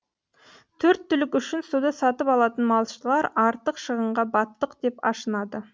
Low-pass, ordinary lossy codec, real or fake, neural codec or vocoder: none; none; real; none